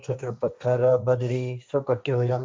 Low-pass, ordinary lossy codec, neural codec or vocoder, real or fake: none; none; codec, 16 kHz, 1.1 kbps, Voila-Tokenizer; fake